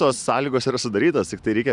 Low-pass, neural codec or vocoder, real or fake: 10.8 kHz; none; real